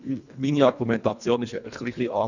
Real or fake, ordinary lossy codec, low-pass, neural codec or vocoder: fake; none; 7.2 kHz; codec, 24 kHz, 1.5 kbps, HILCodec